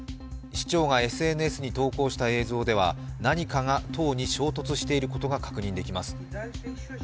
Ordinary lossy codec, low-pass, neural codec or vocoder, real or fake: none; none; none; real